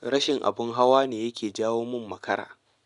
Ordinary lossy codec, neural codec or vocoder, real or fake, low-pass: none; none; real; 10.8 kHz